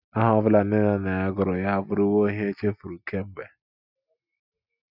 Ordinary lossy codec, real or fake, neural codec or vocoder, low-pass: none; real; none; 5.4 kHz